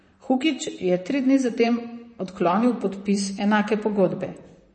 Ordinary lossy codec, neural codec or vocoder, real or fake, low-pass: MP3, 32 kbps; vocoder, 44.1 kHz, 128 mel bands, Pupu-Vocoder; fake; 9.9 kHz